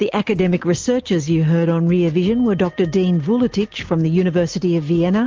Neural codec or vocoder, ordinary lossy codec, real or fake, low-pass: none; Opus, 24 kbps; real; 7.2 kHz